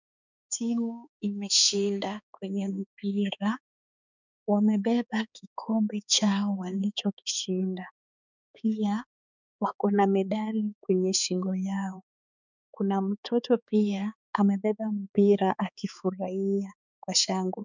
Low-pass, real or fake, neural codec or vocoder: 7.2 kHz; fake; codec, 16 kHz, 4 kbps, X-Codec, HuBERT features, trained on balanced general audio